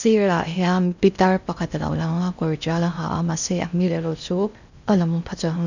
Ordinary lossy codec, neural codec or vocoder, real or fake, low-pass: none; codec, 16 kHz in and 24 kHz out, 0.6 kbps, FocalCodec, streaming, 4096 codes; fake; 7.2 kHz